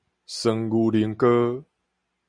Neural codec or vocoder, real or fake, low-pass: none; real; 9.9 kHz